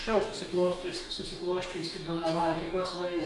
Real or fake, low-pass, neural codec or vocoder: fake; 10.8 kHz; codec, 44.1 kHz, 2.6 kbps, DAC